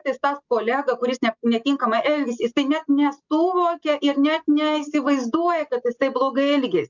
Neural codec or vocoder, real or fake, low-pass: none; real; 7.2 kHz